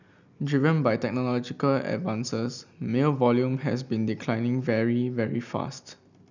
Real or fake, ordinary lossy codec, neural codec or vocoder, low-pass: real; none; none; 7.2 kHz